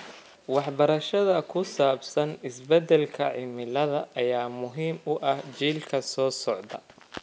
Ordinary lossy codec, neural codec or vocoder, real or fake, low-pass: none; none; real; none